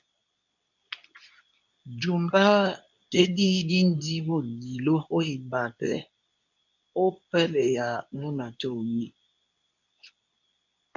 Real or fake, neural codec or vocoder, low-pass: fake; codec, 24 kHz, 0.9 kbps, WavTokenizer, medium speech release version 2; 7.2 kHz